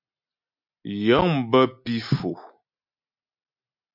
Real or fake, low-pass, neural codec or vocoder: real; 5.4 kHz; none